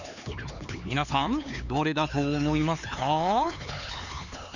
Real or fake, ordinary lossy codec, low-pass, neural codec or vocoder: fake; none; 7.2 kHz; codec, 16 kHz, 4 kbps, X-Codec, HuBERT features, trained on LibriSpeech